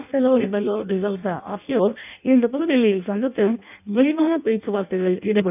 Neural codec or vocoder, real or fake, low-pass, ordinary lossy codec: codec, 16 kHz in and 24 kHz out, 0.6 kbps, FireRedTTS-2 codec; fake; 3.6 kHz; none